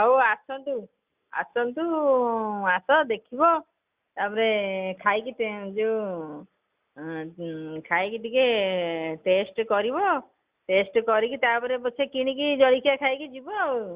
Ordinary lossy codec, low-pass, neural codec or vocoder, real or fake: Opus, 64 kbps; 3.6 kHz; none; real